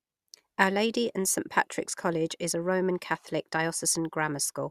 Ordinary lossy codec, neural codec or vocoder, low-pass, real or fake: Opus, 64 kbps; none; 14.4 kHz; real